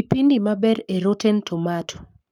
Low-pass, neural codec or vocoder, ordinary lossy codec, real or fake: 19.8 kHz; codec, 44.1 kHz, 7.8 kbps, Pupu-Codec; none; fake